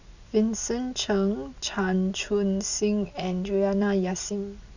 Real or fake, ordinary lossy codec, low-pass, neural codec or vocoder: real; none; 7.2 kHz; none